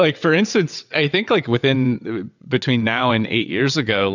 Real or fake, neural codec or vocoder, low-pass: fake; vocoder, 22.05 kHz, 80 mel bands, WaveNeXt; 7.2 kHz